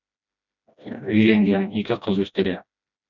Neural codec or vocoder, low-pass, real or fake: codec, 16 kHz, 1 kbps, FreqCodec, smaller model; 7.2 kHz; fake